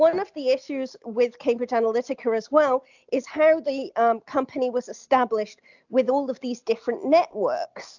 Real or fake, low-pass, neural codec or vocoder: real; 7.2 kHz; none